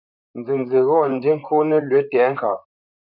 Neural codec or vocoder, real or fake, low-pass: vocoder, 44.1 kHz, 128 mel bands, Pupu-Vocoder; fake; 5.4 kHz